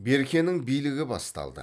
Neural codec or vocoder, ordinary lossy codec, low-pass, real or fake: none; none; none; real